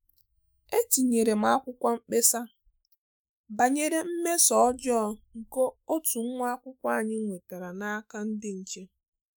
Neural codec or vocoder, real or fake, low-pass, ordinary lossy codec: autoencoder, 48 kHz, 128 numbers a frame, DAC-VAE, trained on Japanese speech; fake; none; none